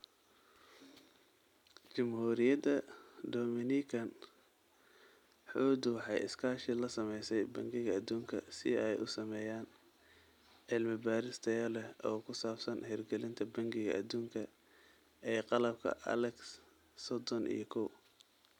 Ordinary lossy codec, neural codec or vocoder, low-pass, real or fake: none; none; 19.8 kHz; real